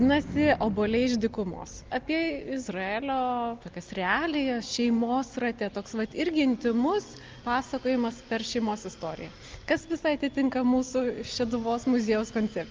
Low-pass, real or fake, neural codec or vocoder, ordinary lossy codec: 7.2 kHz; real; none; Opus, 24 kbps